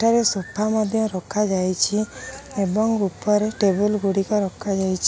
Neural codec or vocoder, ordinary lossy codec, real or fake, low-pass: none; none; real; none